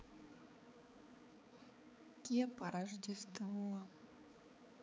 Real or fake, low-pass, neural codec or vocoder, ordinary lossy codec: fake; none; codec, 16 kHz, 4 kbps, X-Codec, HuBERT features, trained on balanced general audio; none